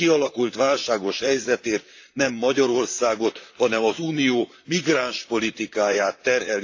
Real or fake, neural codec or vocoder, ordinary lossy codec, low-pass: fake; vocoder, 44.1 kHz, 128 mel bands, Pupu-Vocoder; AAC, 48 kbps; 7.2 kHz